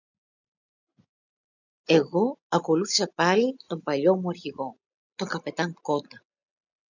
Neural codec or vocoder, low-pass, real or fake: none; 7.2 kHz; real